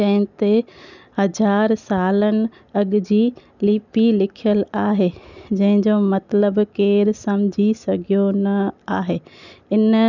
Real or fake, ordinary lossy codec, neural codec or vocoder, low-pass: real; none; none; 7.2 kHz